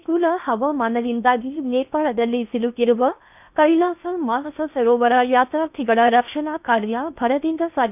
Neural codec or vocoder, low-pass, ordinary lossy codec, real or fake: codec, 16 kHz in and 24 kHz out, 0.8 kbps, FocalCodec, streaming, 65536 codes; 3.6 kHz; none; fake